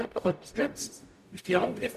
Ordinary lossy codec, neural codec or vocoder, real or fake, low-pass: none; codec, 44.1 kHz, 0.9 kbps, DAC; fake; 14.4 kHz